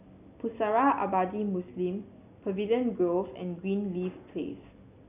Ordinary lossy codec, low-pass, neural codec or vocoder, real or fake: none; 3.6 kHz; none; real